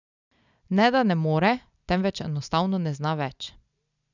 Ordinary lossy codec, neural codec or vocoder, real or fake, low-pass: none; none; real; 7.2 kHz